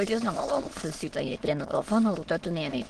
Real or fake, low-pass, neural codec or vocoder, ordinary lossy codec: fake; 9.9 kHz; autoencoder, 22.05 kHz, a latent of 192 numbers a frame, VITS, trained on many speakers; Opus, 16 kbps